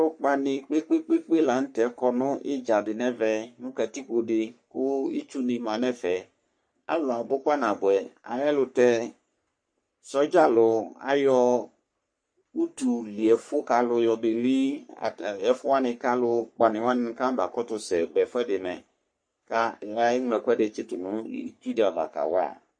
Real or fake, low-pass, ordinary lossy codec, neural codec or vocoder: fake; 9.9 kHz; MP3, 48 kbps; codec, 44.1 kHz, 3.4 kbps, Pupu-Codec